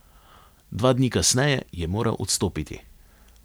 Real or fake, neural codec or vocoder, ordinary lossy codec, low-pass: real; none; none; none